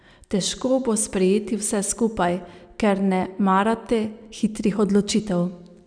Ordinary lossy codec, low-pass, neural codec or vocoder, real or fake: none; 9.9 kHz; none; real